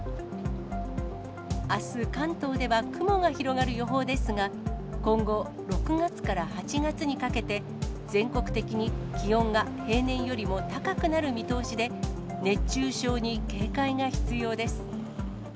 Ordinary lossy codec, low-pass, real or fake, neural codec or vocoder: none; none; real; none